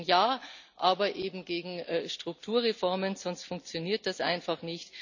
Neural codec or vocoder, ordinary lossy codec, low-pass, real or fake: none; none; 7.2 kHz; real